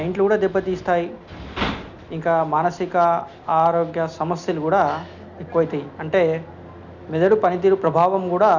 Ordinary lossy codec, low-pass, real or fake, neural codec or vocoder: none; 7.2 kHz; real; none